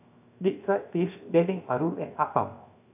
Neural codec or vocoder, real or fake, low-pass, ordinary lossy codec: codec, 16 kHz, 0.7 kbps, FocalCodec; fake; 3.6 kHz; none